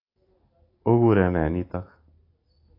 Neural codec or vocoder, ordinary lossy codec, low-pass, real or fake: none; AAC, 24 kbps; 5.4 kHz; real